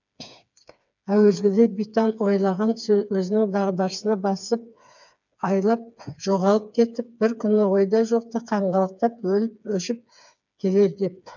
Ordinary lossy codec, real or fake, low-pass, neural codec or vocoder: none; fake; 7.2 kHz; codec, 16 kHz, 4 kbps, FreqCodec, smaller model